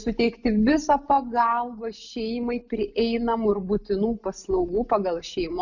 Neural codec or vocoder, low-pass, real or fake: none; 7.2 kHz; real